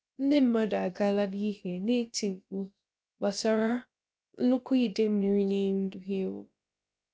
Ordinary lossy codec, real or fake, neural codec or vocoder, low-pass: none; fake; codec, 16 kHz, about 1 kbps, DyCAST, with the encoder's durations; none